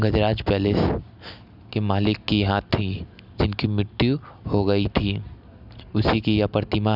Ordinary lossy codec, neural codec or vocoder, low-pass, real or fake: none; none; 5.4 kHz; real